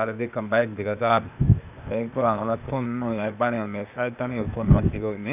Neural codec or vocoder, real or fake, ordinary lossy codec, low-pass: codec, 16 kHz, 0.8 kbps, ZipCodec; fake; none; 3.6 kHz